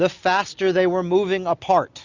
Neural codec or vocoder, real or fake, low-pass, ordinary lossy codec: none; real; 7.2 kHz; Opus, 64 kbps